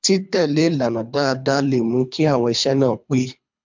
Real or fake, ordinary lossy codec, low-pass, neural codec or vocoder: fake; MP3, 64 kbps; 7.2 kHz; codec, 24 kHz, 3 kbps, HILCodec